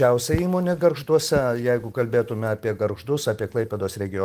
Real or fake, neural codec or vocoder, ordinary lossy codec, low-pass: real; none; Opus, 24 kbps; 14.4 kHz